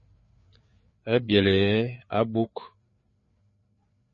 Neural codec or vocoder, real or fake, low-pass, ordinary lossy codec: codec, 16 kHz, 4 kbps, FreqCodec, larger model; fake; 7.2 kHz; MP3, 32 kbps